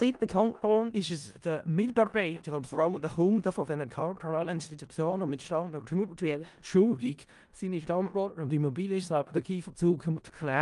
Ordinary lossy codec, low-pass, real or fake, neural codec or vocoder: Opus, 32 kbps; 10.8 kHz; fake; codec, 16 kHz in and 24 kHz out, 0.4 kbps, LongCat-Audio-Codec, four codebook decoder